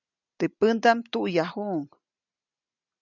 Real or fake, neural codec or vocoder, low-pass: real; none; 7.2 kHz